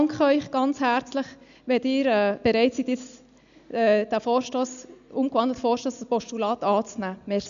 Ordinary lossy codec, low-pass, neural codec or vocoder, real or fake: none; 7.2 kHz; none; real